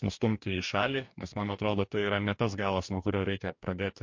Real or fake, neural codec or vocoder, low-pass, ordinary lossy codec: fake; codec, 44.1 kHz, 2.6 kbps, DAC; 7.2 kHz; MP3, 48 kbps